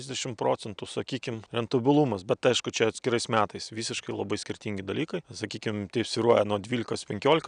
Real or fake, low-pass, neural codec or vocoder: real; 9.9 kHz; none